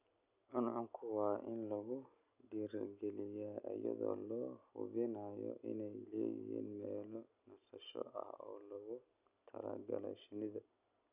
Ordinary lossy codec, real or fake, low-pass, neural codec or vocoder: none; real; 3.6 kHz; none